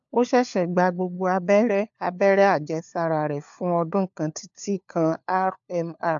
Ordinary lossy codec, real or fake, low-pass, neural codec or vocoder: AAC, 64 kbps; fake; 7.2 kHz; codec, 16 kHz, 4 kbps, FunCodec, trained on LibriTTS, 50 frames a second